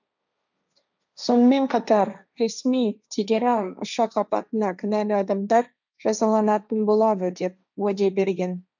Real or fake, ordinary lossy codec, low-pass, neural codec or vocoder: fake; none; 7.2 kHz; codec, 16 kHz, 1.1 kbps, Voila-Tokenizer